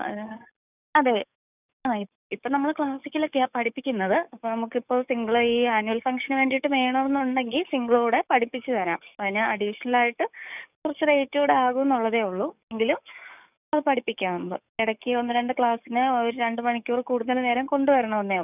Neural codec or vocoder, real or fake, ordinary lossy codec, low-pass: codec, 44.1 kHz, 7.8 kbps, DAC; fake; none; 3.6 kHz